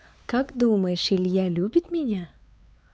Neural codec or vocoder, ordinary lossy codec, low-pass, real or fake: codec, 16 kHz, 4 kbps, X-Codec, WavLM features, trained on Multilingual LibriSpeech; none; none; fake